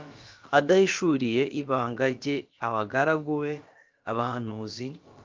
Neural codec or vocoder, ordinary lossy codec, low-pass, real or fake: codec, 16 kHz, about 1 kbps, DyCAST, with the encoder's durations; Opus, 32 kbps; 7.2 kHz; fake